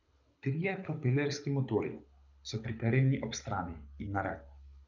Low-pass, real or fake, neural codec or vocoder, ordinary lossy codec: 7.2 kHz; fake; codec, 24 kHz, 6 kbps, HILCodec; none